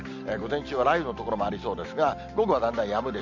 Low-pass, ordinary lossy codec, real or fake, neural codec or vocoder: 7.2 kHz; MP3, 64 kbps; real; none